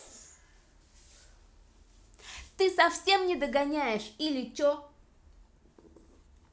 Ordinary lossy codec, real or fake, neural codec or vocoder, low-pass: none; real; none; none